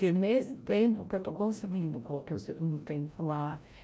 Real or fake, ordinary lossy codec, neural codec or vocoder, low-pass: fake; none; codec, 16 kHz, 0.5 kbps, FreqCodec, larger model; none